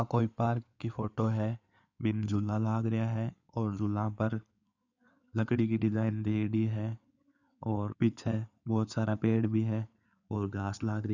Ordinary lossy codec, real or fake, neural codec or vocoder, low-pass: none; fake; codec, 16 kHz in and 24 kHz out, 2.2 kbps, FireRedTTS-2 codec; 7.2 kHz